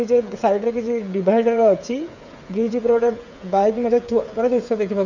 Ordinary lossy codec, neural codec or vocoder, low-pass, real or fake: none; codec, 16 kHz, 8 kbps, FreqCodec, smaller model; 7.2 kHz; fake